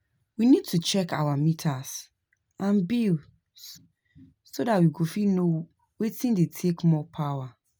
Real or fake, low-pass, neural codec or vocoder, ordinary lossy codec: real; none; none; none